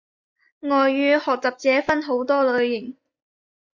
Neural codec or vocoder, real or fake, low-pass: none; real; 7.2 kHz